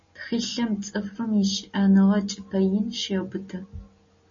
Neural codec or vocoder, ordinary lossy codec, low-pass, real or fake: none; MP3, 32 kbps; 7.2 kHz; real